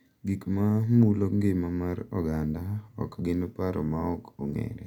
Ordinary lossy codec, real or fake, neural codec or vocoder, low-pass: none; real; none; 19.8 kHz